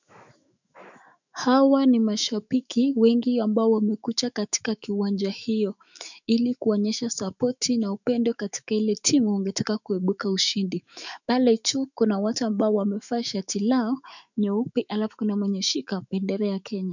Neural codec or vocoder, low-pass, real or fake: autoencoder, 48 kHz, 128 numbers a frame, DAC-VAE, trained on Japanese speech; 7.2 kHz; fake